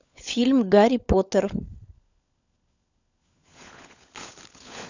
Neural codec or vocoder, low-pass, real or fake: codec, 16 kHz, 16 kbps, FunCodec, trained on LibriTTS, 50 frames a second; 7.2 kHz; fake